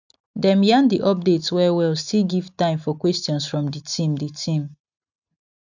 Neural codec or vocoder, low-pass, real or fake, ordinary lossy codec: none; 7.2 kHz; real; none